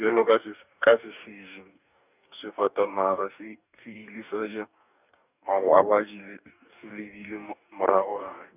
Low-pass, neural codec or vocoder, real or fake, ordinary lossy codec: 3.6 kHz; codec, 44.1 kHz, 2.6 kbps, DAC; fake; none